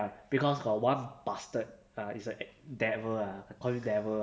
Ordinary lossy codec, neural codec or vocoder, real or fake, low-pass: none; none; real; none